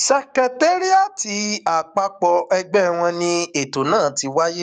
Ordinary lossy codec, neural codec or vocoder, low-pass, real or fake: none; codec, 44.1 kHz, 7.8 kbps, DAC; 9.9 kHz; fake